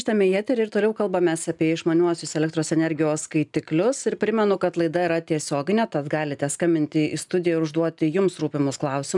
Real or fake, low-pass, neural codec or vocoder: real; 10.8 kHz; none